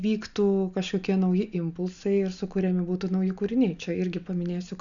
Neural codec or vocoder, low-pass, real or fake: none; 7.2 kHz; real